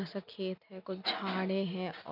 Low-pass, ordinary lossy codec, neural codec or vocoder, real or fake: 5.4 kHz; none; none; real